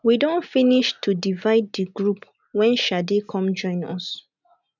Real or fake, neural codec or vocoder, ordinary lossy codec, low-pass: real; none; none; 7.2 kHz